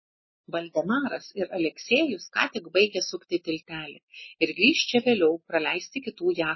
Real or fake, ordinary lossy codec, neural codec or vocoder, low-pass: real; MP3, 24 kbps; none; 7.2 kHz